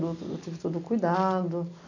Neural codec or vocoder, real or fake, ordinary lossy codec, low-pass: none; real; none; 7.2 kHz